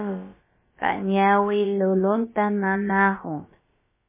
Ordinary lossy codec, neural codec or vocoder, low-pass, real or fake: MP3, 16 kbps; codec, 16 kHz, about 1 kbps, DyCAST, with the encoder's durations; 3.6 kHz; fake